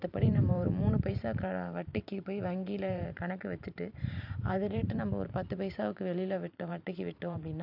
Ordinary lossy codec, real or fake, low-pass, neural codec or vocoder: none; fake; 5.4 kHz; vocoder, 44.1 kHz, 128 mel bands every 512 samples, BigVGAN v2